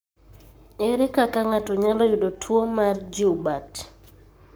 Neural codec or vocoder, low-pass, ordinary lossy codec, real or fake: codec, 44.1 kHz, 7.8 kbps, Pupu-Codec; none; none; fake